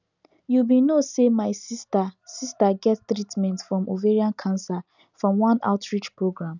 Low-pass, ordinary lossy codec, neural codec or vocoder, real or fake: 7.2 kHz; none; none; real